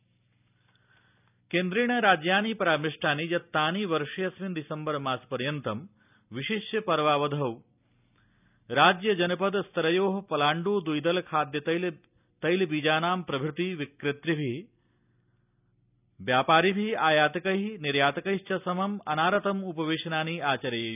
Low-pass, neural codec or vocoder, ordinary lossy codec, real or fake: 3.6 kHz; none; none; real